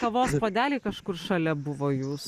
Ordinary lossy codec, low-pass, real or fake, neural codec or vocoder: Opus, 64 kbps; 14.4 kHz; real; none